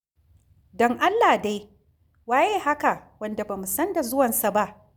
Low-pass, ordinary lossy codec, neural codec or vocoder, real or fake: none; none; none; real